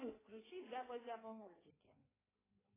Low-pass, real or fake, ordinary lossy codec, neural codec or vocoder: 3.6 kHz; fake; AAC, 16 kbps; codec, 16 kHz in and 24 kHz out, 1.1 kbps, FireRedTTS-2 codec